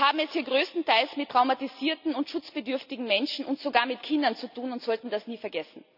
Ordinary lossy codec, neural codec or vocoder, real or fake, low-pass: none; none; real; 5.4 kHz